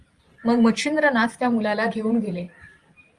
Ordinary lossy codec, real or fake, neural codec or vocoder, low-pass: Opus, 32 kbps; fake; vocoder, 44.1 kHz, 128 mel bands every 512 samples, BigVGAN v2; 10.8 kHz